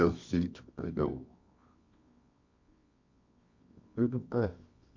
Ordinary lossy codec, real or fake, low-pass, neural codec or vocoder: MP3, 48 kbps; fake; 7.2 kHz; codec, 24 kHz, 0.9 kbps, WavTokenizer, medium music audio release